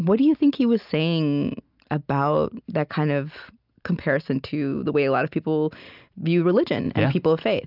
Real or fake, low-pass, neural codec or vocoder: real; 5.4 kHz; none